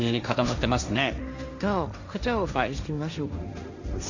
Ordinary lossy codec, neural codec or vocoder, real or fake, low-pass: none; codec, 16 kHz, 1.1 kbps, Voila-Tokenizer; fake; 7.2 kHz